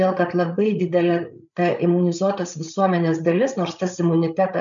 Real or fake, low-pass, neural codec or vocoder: fake; 7.2 kHz; codec, 16 kHz, 8 kbps, FreqCodec, larger model